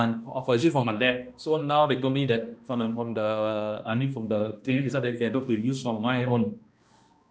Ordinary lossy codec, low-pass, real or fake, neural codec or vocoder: none; none; fake; codec, 16 kHz, 1 kbps, X-Codec, HuBERT features, trained on balanced general audio